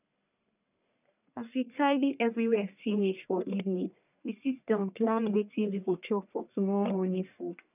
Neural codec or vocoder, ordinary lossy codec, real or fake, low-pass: codec, 44.1 kHz, 1.7 kbps, Pupu-Codec; none; fake; 3.6 kHz